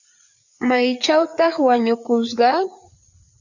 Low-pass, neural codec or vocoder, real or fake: 7.2 kHz; codec, 16 kHz, 4 kbps, FreqCodec, larger model; fake